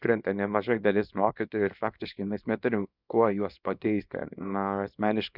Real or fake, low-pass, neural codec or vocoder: fake; 5.4 kHz; codec, 24 kHz, 0.9 kbps, WavTokenizer, small release